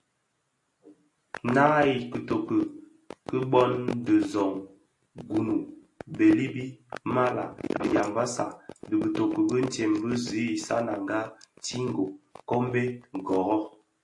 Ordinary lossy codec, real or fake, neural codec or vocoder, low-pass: AAC, 48 kbps; real; none; 10.8 kHz